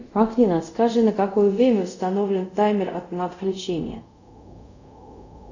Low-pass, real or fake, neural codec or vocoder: 7.2 kHz; fake; codec, 24 kHz, 0.5 kbps, DualCodec